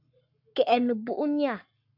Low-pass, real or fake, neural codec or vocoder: 5.4 kHz; fake; codec, 44.1 kHz, 7.8 kbps, Pupu-Codec